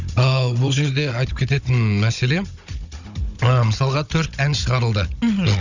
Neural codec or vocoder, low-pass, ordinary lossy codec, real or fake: codec, 16 kHz, 16 kbps, FunCodec, trained on Chinese and English, 50 frames a second; 7.2 kHz; none; fake